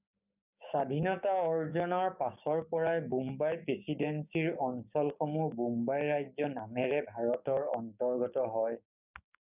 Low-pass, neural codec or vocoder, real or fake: 3.6 kHz; codec, 16 kHz, 6 kbps, DAC; fake